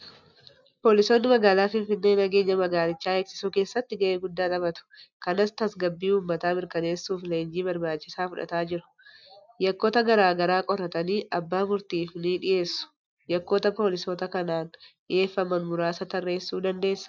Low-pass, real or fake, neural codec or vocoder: 7.2 kHz; real; none